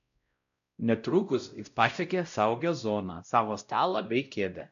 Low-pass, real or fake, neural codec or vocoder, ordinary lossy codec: 7.2 kHz; fake; codec, 16 kHz, 0.5 kbps, X-Codec, WavLM features, trained on Multilingual LibriSpeech; MP3, 96 kbps